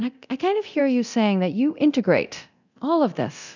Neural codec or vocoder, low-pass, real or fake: codec, 24 kHz, 0.9 kbps, DualCodec; 7.2 kHz; fake